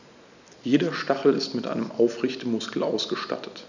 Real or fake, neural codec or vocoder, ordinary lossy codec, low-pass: real; none; none; 7.2 kHz